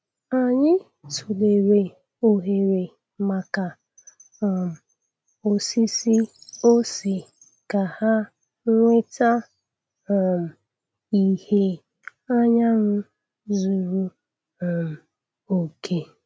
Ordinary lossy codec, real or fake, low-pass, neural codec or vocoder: none; real; none; none